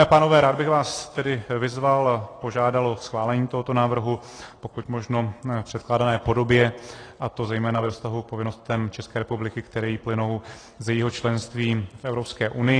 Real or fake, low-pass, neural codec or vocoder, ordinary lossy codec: fake; 9.9 kHz; vocoder, 44.1 kHz, 128 mel bands every 256 samples, BigVGAN v2; AAC, 32 kbps